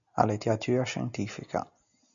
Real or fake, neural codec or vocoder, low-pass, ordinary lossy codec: real; none; 7.2 kHz; MP3, 96 kbps